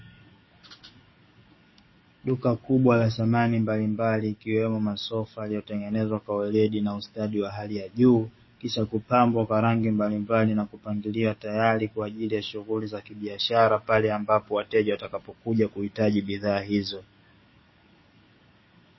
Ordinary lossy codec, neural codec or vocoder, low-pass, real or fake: MP3, 24 kbps; codec, 44.1 kHz, 7.8 kbps, DAC; 7.2 kHz; fake